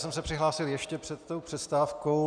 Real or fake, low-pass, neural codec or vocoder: real; 9.9 kHz; none